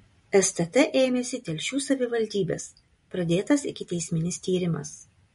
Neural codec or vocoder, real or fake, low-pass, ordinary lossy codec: none; real; 10.8 kHz; MP3, 48 kbps